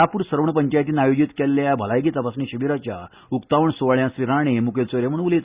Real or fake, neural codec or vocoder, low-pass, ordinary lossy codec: real; none; 3.6 kHz; Opus, 64 kbps